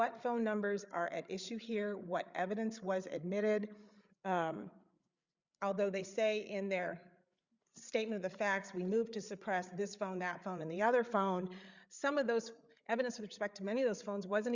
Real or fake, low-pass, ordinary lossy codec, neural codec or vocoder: fake; 7.2 kHz; Opus, 64 kbps; codec, 16 kHz, 8 kbps, FreqCodec, larger model